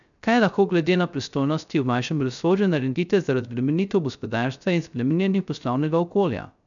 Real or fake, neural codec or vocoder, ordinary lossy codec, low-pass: fake; codec, 16 kHz, 0.3 kbps, FocalCodec; none; 7.2 kHz